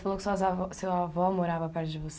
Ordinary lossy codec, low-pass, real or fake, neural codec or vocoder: none; none; real; none